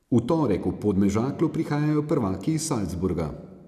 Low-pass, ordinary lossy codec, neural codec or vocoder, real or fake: 14.4 kHz; none; none; real